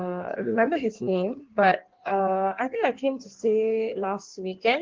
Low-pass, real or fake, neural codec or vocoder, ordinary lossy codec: 7.2 kHz; fake; codec, 16 kHz in and 24 kHz out, 1.1 kbps, FireRedTTS-2 codec; Opus, 16 kbps